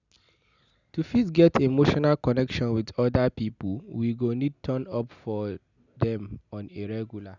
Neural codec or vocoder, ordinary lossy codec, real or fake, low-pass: none; none; real; 7.2 kHz